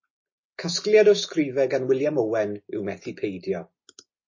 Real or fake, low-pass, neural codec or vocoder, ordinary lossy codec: real; 7.2 kHz; none; MP3, 32 kbps